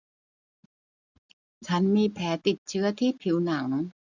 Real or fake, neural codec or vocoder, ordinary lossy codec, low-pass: real; none; none; 7.2 kHz